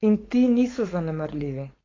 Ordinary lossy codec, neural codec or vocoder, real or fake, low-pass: AAC, 32 kbps; codec, 16 kHz, 4.8 kbps, FACodec; fake; 7.2 kHz